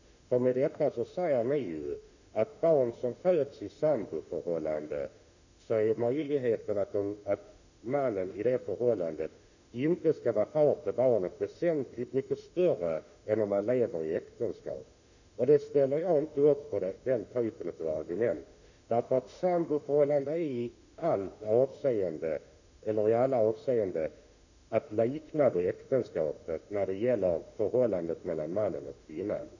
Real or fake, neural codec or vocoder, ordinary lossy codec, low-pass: fake; autoencoder, 48 kHz, 32 numbers a frame, DAC-VAE, trained on Japanese speech; none; 7.2 kHz